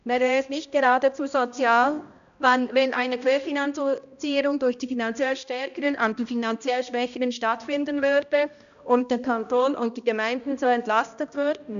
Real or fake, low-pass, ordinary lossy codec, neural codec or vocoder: fake; 7.2 kHz; none; codec, 16 kHz, 1 kbps, X-Codec, HuBERT features, trained on balanced general audio